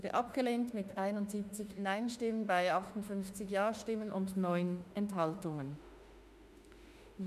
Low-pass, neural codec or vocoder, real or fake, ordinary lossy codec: 14.4 kHz; autoencoder, 48 kHz, 32 numbers a frame, DAC-VAE, trained on Japanese speech; fake; none